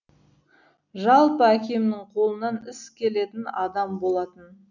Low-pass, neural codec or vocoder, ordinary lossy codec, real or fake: 7.2 kHz; none; none; real